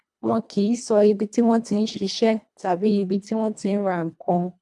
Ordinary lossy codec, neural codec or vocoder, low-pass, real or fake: none; codec, 24 kHz, 1.5 kbps, HILCodec; none; fake